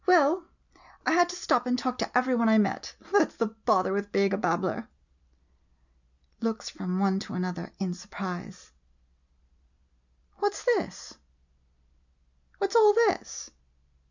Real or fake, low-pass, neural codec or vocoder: real; 7.2 kHz; none